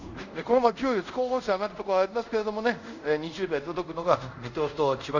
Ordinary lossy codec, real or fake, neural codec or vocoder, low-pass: none; fake; codec, 24 kHz, 0.5 kbps, DualCodec; 7.2 kHz